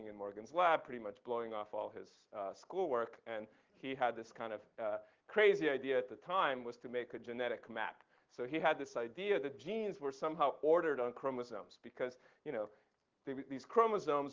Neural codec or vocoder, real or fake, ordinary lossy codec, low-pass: none; real; Opus, 16 kbps; 7.2 kHz